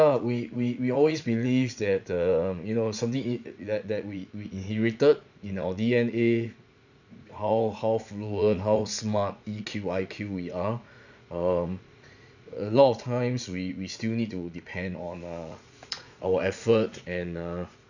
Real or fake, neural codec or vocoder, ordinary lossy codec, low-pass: fake; vocoder, 44.1 kHz, 80 mel bands, Vocos; none; 7.2 kHz